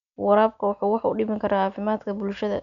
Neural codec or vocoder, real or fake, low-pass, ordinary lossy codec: none; real; 7.2 kHz; none